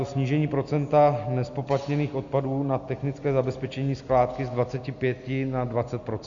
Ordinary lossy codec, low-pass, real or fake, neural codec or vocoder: Opus, 64 kbps; 7.2 kHz; real; none